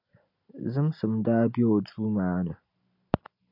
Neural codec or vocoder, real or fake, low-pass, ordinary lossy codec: none; real; 5.4 kHz; AAC, 48 kbps